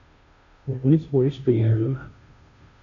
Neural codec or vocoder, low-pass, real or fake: codec, 16 kHz, 0.5 kbps, FunCodec, trained on Chinese and English, 25 frames a second; 7.2 kHz; fake